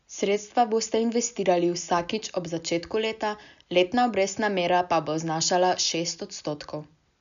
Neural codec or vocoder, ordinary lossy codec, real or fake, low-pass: none; none; real; 7.2 kHz